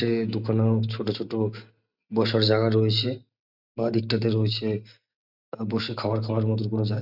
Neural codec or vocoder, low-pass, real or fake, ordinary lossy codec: none; 5.4 kHz; real; none